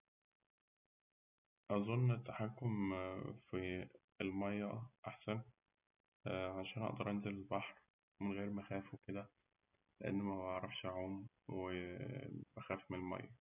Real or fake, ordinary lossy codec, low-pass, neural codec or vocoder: real; none; 3.6 kHz; none